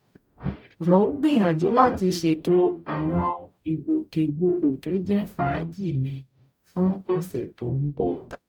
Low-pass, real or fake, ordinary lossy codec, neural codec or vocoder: 19.8 kHz; fake; none; codec, 44.1 kHz, 0.9 kbps, DAC